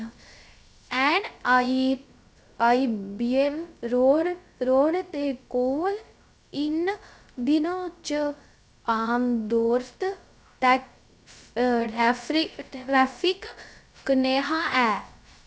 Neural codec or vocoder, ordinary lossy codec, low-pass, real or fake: codec, 16 kHz, 0.3 kbps, FocalCodec; none; none; fake